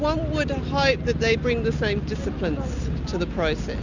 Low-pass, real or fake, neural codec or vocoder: 7.2 kHz; real; none